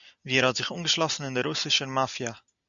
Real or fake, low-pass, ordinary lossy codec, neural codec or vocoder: real; 7.2 kHz; MP3, 96 kbps; none